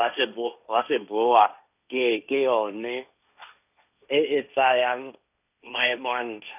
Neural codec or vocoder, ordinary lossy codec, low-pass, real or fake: codec, 16 kHz, 1.1 kbps, Voila-Tokenizer; none; 3.6 kHz; fake